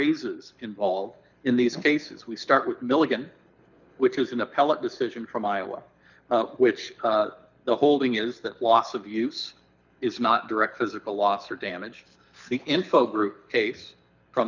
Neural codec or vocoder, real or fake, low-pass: codec, 24 kHz, 6 kbps, HILCodec; fake; 7.2 kHz